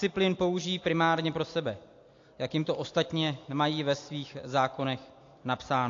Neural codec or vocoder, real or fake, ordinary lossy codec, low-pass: none; real; AAC, 48 kbps; 7.2 kHz